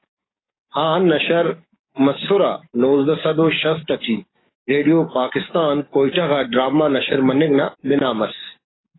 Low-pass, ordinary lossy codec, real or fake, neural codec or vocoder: 7.2 kHz; AAC, 16 kbps; fake; codec, 44.1 kHz, 7.8 kbps, DAC